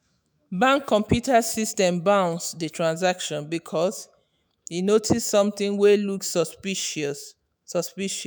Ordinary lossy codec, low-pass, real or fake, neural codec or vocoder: none; none; fake; autoencoder, 48 kHz, 128 numbers a frame, DAC-VAE, trained on Japanese speech